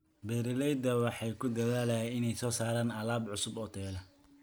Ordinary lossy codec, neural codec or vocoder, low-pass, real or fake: none; none; none; real